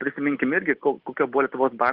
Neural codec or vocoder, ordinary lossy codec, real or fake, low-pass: none; Opus, 16 kbps; real; 5.4 kHz